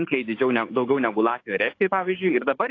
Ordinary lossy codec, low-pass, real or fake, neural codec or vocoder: AAC, 32 kbps; 7.2 kHz; fake; codec, 44.1 kHz, 7.8 kbps, DAC